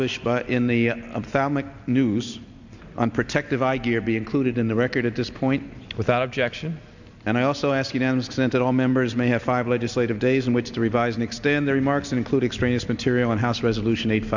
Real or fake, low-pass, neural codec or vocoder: real; 7.2 kHz; none